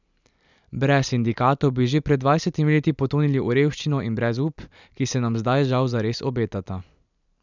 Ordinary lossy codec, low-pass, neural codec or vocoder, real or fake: none; 7.2 kHz; vocoder, 44.1 kHz, 128 mel bands every 256 samples, BigVGAN v2; fake